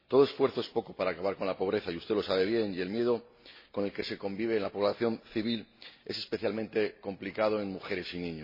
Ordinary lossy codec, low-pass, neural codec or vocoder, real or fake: MP3, 24 kbps; 5.4 kHz; none; real